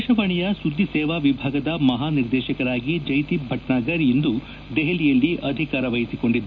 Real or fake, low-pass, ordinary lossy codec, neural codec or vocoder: real; 7.2 kHz; none; none